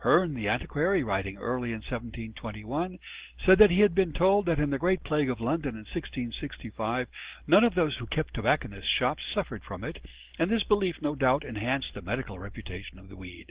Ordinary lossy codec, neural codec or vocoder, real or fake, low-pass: Opus, 16 kbps; none; real; 3.6 kHz